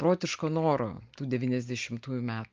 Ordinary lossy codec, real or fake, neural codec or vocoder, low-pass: Opus, 24 kbps; real; none; 7.2 kHz